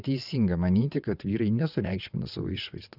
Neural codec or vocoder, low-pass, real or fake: vocoder, 22.05 kHz, 80 mel bands, WaveNeXt; 5.4 kHz; fake